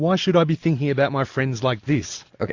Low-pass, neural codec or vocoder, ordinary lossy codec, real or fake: 7.2 kHz; none; AAC, 48 kbps; real